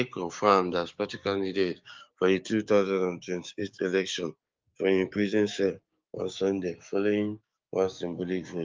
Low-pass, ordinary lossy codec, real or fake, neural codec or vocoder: 7.2 kHz; Opus, 32 kbps; fake; codec, 16 kHz, 6 kbps, DAC